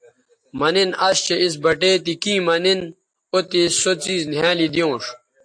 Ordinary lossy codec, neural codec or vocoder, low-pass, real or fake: AAC, 64 kbps; none; 9.9 kHz; real